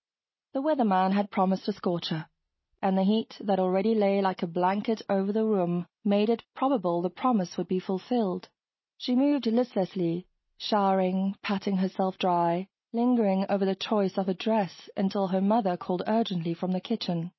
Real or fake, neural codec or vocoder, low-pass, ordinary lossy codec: real; none; 7.2 kHz; MP3, 24 kbps